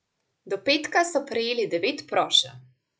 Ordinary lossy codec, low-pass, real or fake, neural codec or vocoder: none; none; real; none